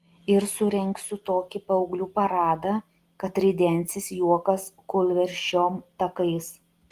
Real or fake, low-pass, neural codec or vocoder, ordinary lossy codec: real; 14.4 kHz; none; Opus, 24 kbps